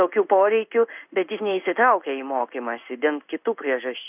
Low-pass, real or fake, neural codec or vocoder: 3.6 kHz; fake; codec, 16 kHz in and 24 kHz out, 1 kbps, XY-Tokenizer